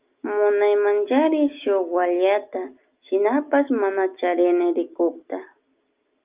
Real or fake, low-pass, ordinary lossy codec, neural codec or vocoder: real; 3.6 kHz; Opus, 24 kbps; none